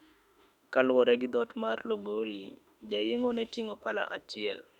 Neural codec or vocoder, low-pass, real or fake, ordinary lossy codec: autoencoder, 48 kHz, 32 numbers a frame, DAC-VAE, trained on Japanese speech; 19.8 kHz; fake; none